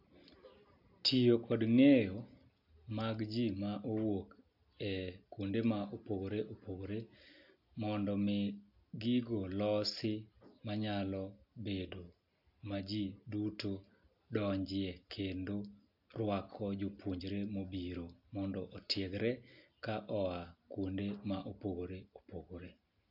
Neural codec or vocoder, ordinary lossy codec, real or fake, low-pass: none; AAC, 48 kbps; real; 5.4 kHz